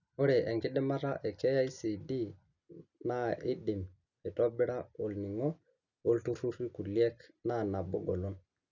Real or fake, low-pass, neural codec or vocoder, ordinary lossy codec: real; 7.2 kHz; none; none